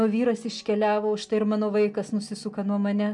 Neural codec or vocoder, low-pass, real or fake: none; 10.8 kHz; real